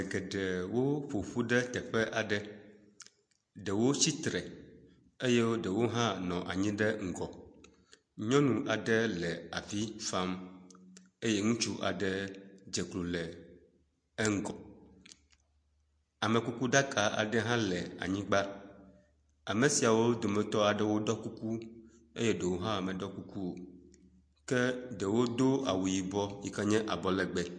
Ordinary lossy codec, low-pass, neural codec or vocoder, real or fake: MP3, 48 kbps; 9.9 kHz; none; real